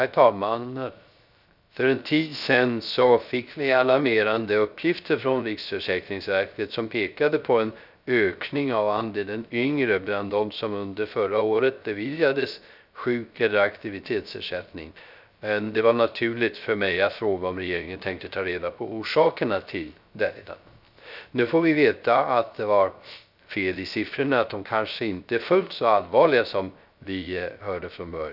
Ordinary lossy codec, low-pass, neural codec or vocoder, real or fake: none; 5.4 kHz; codec, 16 kHz, 0.3 kbps, FocalCodec; fake